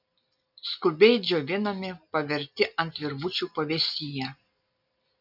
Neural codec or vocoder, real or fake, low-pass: none; real; 5.4 kHz